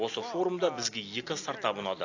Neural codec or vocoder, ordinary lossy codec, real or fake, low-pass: none; AAC, 48 kbps; real; 7.2 kHz